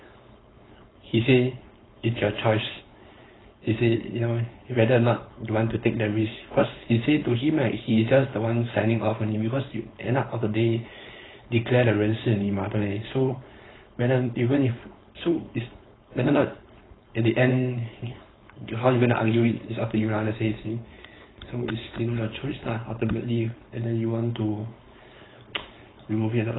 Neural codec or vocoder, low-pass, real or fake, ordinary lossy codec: codec, 16 kHz, 4.8 kbps, FACodec; 7.2 kHz; fake; AAC, 16 kbps